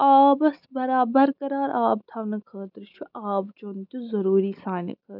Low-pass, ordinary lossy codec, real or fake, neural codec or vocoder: 5.4 kHz; none; real; none